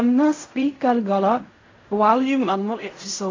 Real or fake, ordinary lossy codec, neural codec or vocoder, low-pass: fake; AAC, 32 kbps; codec, 16 kHz in and 24 kHz out, 0.4 kbps, LongCat-Audio-Codec, fine tuned four codebook decoder; 7.2 kHz